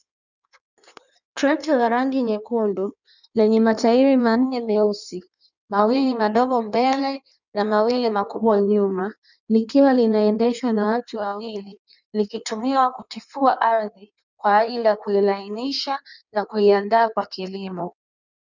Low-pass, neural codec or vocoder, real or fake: 7.2 kHz; codec, 16 kHz in and 24 kHz out, 1.1 kbps, FireRedTTS-2 codec; fake